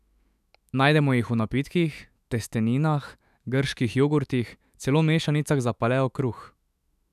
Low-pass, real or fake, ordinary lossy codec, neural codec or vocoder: 14.4 kHz; fake; none; autoencoder, 48 kHz, 128 numbers a frame, DAC-VAE, trained on Japanese speech